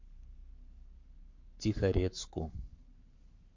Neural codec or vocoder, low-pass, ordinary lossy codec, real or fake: codec, 44.1 kHz, 7.8 kbps, Pupu-Codec; 7.2 kHz; MP3, 48 kbps; fake